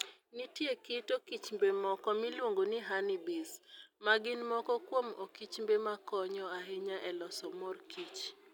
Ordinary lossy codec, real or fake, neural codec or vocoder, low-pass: none; real; none; 19.8 kHz